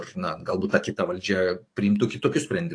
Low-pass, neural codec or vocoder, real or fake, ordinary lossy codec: 9.9 kHz; codec, 44.1 kHz, 7.8 kbps, DAC; fake; AAC, 48 kbps